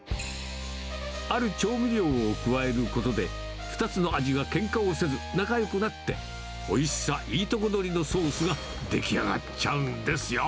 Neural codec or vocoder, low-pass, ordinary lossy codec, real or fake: none; none; none; real